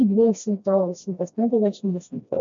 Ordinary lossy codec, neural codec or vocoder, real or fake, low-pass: MP3, 48 kbps; codec, 16 kHz, 1 kbps, FreqCodec, smaller model; fake; 7.2 kHz